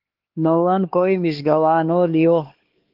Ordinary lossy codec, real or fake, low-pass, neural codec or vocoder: Opus, 16 kbps; fake; 5.4 kHz; codec, 16 kHz, 2 kbps, X-Codec, HuBERT features, trained on LibriSpeech